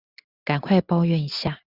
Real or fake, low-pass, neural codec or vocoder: real; 5.4 kHz; none